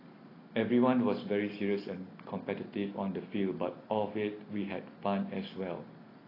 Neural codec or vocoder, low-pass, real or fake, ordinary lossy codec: none; 5.4 kHz; real; AAC, 24 kbps